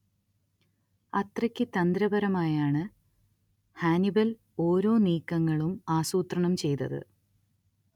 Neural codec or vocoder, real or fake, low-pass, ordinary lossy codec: none; real; 19.8 kHz; none